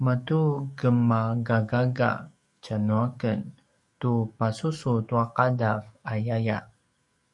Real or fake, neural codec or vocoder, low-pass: fake; codec, 44.1 kHz, 7.8 kbps, DAC; 10.8 kHz